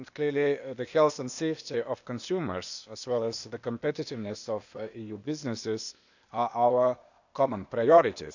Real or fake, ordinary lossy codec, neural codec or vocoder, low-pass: fake; none; codec, 16 kHz, 0.8 kbps, ZipCodec; 7.2 kHz